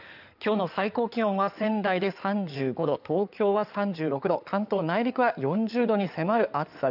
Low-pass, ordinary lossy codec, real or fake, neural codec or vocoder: 5.4 kHz; none; fake; codec, 16 kHz in and 24 kHz out, 2.2 kbps, FireRedTTS-2 codec